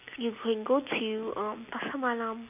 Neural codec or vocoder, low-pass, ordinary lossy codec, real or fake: none; 3.6 kHz; none; real